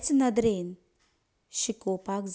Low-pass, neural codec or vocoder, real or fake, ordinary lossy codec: none; none; real; none